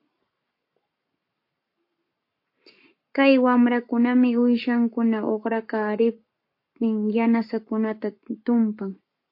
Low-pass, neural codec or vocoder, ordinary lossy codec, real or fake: 5.4 kHz; codec, 44.1 kHz, 7.8 kbps, Pupu-Codec; MP3, 32 kbps; fake